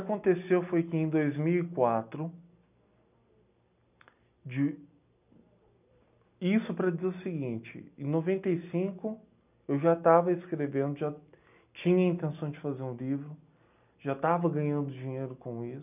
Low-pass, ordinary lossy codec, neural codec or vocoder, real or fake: 3.6 kHz; none; none; real